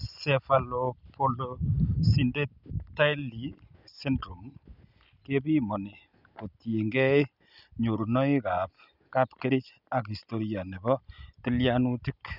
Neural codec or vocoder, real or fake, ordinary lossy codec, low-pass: none; real; none; 5.4 kHz